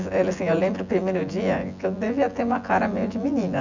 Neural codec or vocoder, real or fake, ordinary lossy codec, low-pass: vocoder, 24 kHz, 100 mel bands, Vocos; fake; none; 7.2 kHz